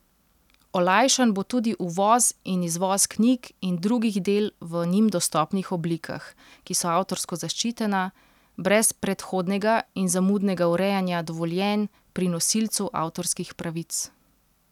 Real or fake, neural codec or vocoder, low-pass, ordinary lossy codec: real; none; 19.8 kHz; none